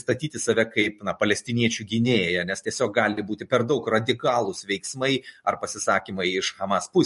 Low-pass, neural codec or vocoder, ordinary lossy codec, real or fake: 14.4 kHz; none; MP3, 48 kbps; real